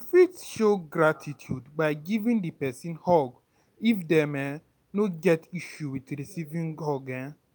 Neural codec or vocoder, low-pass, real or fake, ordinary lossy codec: none; none; real; none